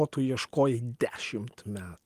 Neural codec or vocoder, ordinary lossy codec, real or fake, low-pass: none; Opus, 24 kbps; real; 14.4 kHz